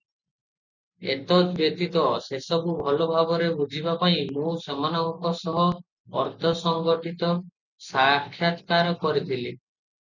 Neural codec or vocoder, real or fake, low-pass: none; real; 7.2 kHz